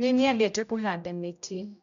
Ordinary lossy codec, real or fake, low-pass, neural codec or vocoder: none; fake; 7.2 kHz; codec, 16 kHz, 0.5 kbps, X-Codec, HuBERT features, trained on general audio